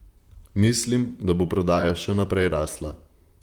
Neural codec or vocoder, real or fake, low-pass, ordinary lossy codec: vocoder, 44.1 kHz, 128 mel bands, Pupu-Vocoder; fake; 19.8 kHz; Opus, 32 kbps